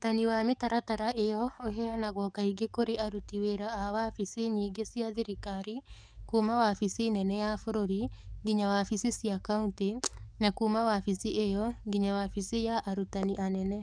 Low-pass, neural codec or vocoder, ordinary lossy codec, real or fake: 9.9 kHz; codec, 44.1 kHz, 7.8 kbps, DAC; none; fake